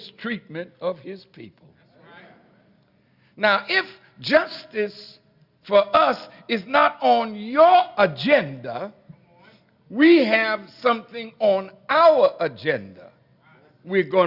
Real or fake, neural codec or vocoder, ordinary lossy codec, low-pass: real; none; Opus, 64 kbps; 5.4 kHz